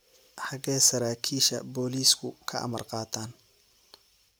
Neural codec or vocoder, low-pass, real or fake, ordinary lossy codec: none; none; real; none